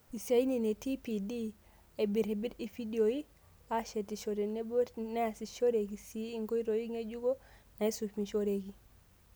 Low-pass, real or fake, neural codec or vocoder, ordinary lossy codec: none; real; none; none